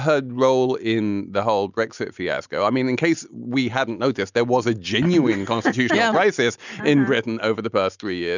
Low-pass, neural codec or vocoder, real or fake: 7.2 kHz; none; real